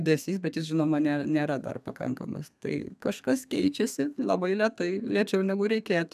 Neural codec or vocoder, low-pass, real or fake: codec, 32 kHz, 1.9 kbps, SNAC; 14.4 kHz; fake